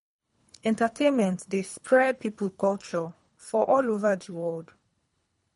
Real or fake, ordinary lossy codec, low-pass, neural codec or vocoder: fake; MP3, 48 kbps; 10.8 kHz; codec, 24 kHz, 3 kbps, HILCodec